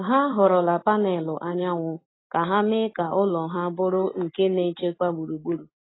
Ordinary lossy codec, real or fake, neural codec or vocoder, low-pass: AAC, 16 kbps; real; none; 7.2 kHz